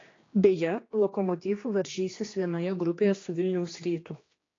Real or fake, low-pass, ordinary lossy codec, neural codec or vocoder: fake; 7.2 kHz; AAC, 32 kbps; codec, 16 kHz, 2 kbps, X-Codec, HuBERT features, trained on general audio